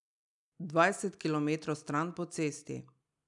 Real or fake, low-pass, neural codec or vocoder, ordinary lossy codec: real; 10.8 kHz; none; none